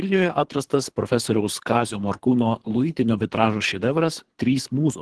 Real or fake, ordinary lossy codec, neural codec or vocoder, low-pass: fake; Opus, 16 kbps; codec, 24 kHz, 3 kbps, HILCodec; 10.8 kHz